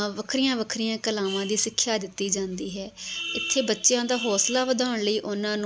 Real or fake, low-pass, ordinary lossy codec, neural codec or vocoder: real; none; none; none